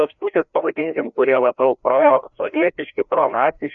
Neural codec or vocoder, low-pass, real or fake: codec, 16 kHz, 1 kbps, FreqCodec, larger model; 7.2 kHz; fake